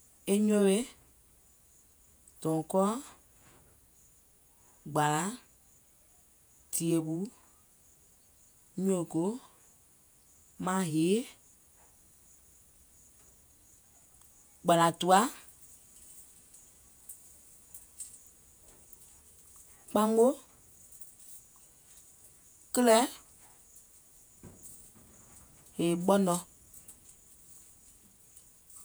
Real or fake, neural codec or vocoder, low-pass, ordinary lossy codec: fake; vocoder, 48 kHz, 128 mel bands, Vocos; none; none